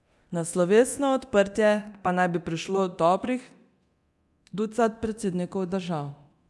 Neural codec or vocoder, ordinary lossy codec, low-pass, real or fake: codec, 24 kHz, 0.9 kbps, DualCodec; none; none; fake